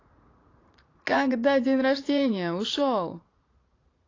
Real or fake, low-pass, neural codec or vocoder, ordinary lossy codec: fake; 7.2 kHz; vocoder, 44.1 kHz, 80 mel bands, Vocos; AAC, 32 kbps